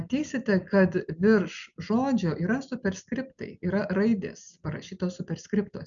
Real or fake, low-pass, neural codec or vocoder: real; 7.2 kHz; none